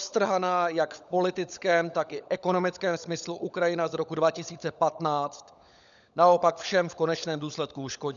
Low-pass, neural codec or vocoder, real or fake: 7.2 kHz; codec, 16 kHz, 16 kbps, FunCodec, trained on LibriTTS, 50 frames a second; fake